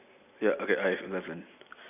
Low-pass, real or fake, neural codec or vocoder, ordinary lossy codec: 3.6 kHz; real; none; none